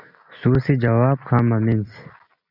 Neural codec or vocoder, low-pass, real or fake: none; 5.4 kHz; real